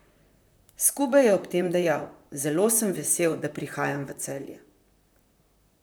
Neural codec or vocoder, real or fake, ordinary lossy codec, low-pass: vocoder, 44.1 kHz, 128 mel bands, Pupu-Vocoder; fake; none; none